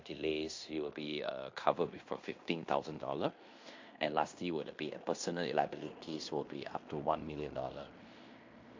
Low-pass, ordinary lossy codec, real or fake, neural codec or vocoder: 7.2 kHz; MP3, 48 kbps; fake; codec, 16 kHz in and 24 kHz out, 0.9 kbps, LongCat-Audio-Codec, fine tuned four codebook decoder